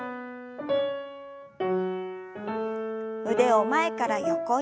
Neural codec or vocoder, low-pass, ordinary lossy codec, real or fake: none; none; none; real